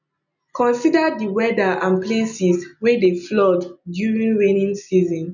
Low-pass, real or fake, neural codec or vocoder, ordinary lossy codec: 7.2 kHz; real; none; none